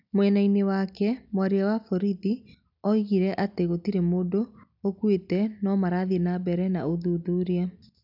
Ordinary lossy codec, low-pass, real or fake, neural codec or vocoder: none; 5.4 kHz; real; none